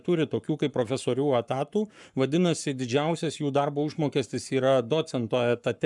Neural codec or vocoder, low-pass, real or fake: codec, 44.1 kHz, 7.8 kbps, Pupu-Codec; 10.8 kHz; fake